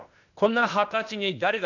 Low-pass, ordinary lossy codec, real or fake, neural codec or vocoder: 7.2 kHz; none; fake; codec, 16 kHz, 0.8 kbps, ZipCodec